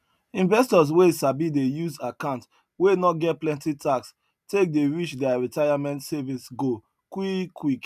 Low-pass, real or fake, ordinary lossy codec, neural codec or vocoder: 14.4 kHz; real; none; none